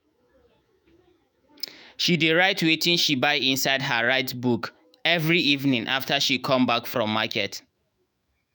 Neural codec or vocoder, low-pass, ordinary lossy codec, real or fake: autoencoder, 48 kHz, 128 numbers a frame, DAC-VAE, trained on Japanese speech; none; none; fake